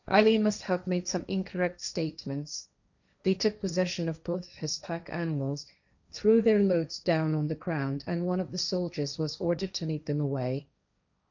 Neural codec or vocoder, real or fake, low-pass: codec, 16 kHz, 1.1 kbps, Voila-Tokenizer; fake; 7.2 kHz